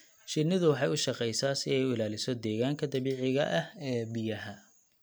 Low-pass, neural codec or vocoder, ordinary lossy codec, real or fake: none; none; none; real